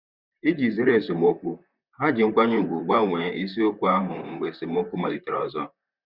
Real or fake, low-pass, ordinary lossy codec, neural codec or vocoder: fake; 5.4 kHz; Opus, 64 kbps; vocoder, 44.1 kHz, 128 mel bands, Pupu-Vocoder